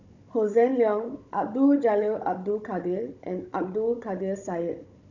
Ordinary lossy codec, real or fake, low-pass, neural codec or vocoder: none; fake; 7.2 kHz; codec, 16 kHz, 16 kbps, FunCodec, trained on Chinese and English, 50 frames a second